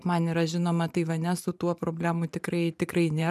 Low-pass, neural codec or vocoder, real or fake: 14.4 kHz; vocoder, 44.1 kHz, 128 mel bands every 512 samples, BigVGAN v2; fake